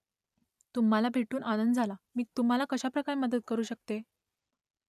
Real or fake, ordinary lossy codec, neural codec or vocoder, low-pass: real; none; none; 14.4 kHz